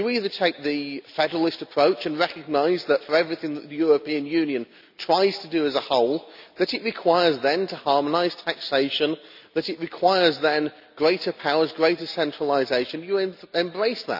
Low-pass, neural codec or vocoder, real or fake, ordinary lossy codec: 5.4 kHz; none; real; none